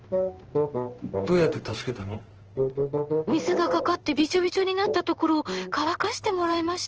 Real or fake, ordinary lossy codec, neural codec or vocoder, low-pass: fake; Opus, 16 kbps; codec, 16 kHz in and 24 kHz out, 1 kbps, XY-Tokenizer; 7.2 kHz